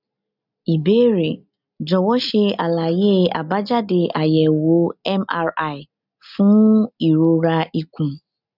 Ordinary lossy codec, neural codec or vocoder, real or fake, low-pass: none; none; real; 5.4 kHz